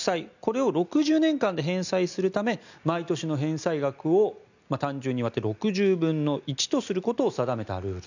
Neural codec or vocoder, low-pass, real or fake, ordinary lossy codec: none; 7.2 kHz; real; none